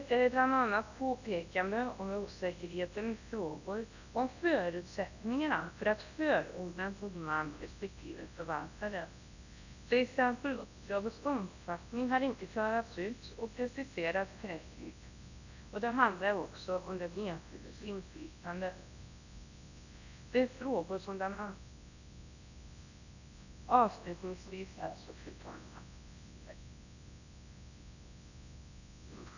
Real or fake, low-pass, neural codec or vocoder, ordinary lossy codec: fake; 7.2 kHz; codec, 24 kHz, 0.9 kbps, WavTokenizer, large speech release; none